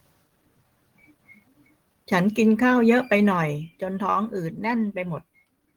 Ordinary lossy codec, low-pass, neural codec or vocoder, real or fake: Opus, 16 kbps; 19.8 kHz; none; real